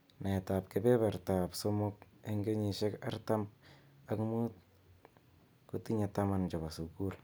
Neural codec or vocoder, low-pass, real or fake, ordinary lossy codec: none; none; real; none